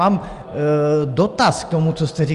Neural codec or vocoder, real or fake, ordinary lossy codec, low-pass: none; real; Opus, 32 kbps; 10.8 kHz